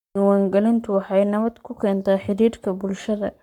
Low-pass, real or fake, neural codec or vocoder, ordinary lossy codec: 19.8 kHz; fake; codec, 44.1 kHz, 7.8 kbps, Pupu-Codec; none